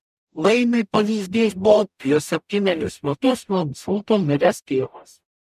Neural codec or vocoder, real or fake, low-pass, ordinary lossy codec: codec, 44.1 kHz, 0.9 kbps, DAC; fake; 14.4 kHz; MP3, 96 kbps